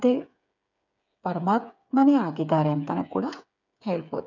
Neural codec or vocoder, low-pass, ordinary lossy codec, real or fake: codec, 16 kHz, 8 kbps, FreqCodec, smaller model; 7.2 kHz; none; fake